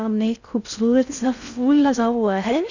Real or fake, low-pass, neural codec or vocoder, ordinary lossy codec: fake; 7.2 kHz; codec, 16 kHz in and 24 kHz out, 0.6 kbps, FocalCodec, streaming, 4096 codes; none